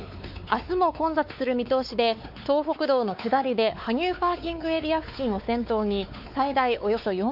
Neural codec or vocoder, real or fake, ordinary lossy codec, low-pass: codec, 16 kHz, 4 kbps, X-Codec, WavLM features, trained on Multilingual LibriSpeech; fake; none; 5.4 kHz